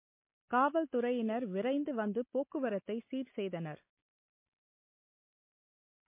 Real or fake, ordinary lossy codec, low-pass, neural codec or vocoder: real; MP3, 24 kbps; 3.6 kHz; none